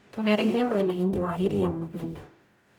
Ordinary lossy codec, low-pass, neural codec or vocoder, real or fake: none; 19.8 kHz; codec, 44.1 kHz, 0.9 kbps, DAC; fake